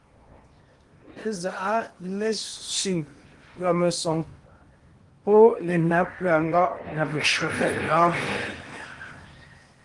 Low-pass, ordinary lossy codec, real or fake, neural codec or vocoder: 10.8 kHz; Opus, 24 kbps; fake; codec, 16 kHz in and 24 kHz out, 0.8 kbps, FocalCodec, streaming, 65536 codes